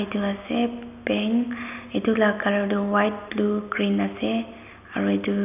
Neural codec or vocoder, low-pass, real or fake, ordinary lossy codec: none; 3.6 kHz; real; none